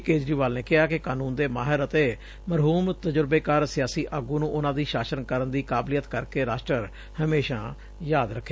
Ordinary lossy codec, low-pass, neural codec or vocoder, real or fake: none; none; none; real